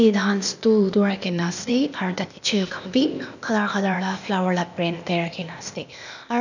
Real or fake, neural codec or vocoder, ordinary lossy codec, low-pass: fake; codec, 16 kHz, 0.8 kbps, ZipCodec; none; 7.2 kHz